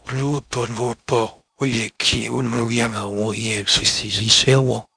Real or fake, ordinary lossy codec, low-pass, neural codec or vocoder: fake; none; 9.9 kHz; codec, 16 kHz in and 24 kHz out, 0.6 kbps, FocalCodec, streaming, 2048 codes